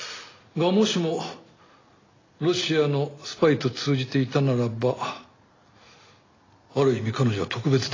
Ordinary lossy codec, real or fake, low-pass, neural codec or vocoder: AAC, 32 kbps; real; 7.2 kHz; none